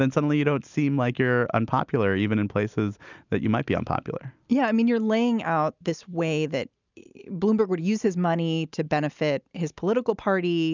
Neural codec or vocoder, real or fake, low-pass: none; real; 7.2 kHz